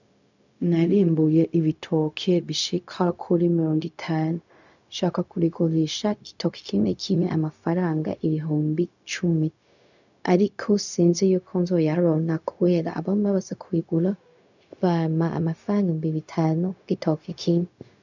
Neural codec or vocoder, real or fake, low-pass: codec, 16 kHz, 0.4 kbps, LongCat-Audio-Codec; fake; 7.2 kHz